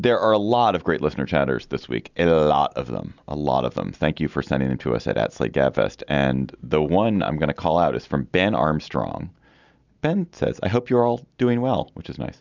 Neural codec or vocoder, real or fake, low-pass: none; real; 7.2 kHz